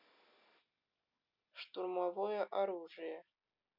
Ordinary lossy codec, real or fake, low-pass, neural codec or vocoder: none; real; 5.4 kHz; none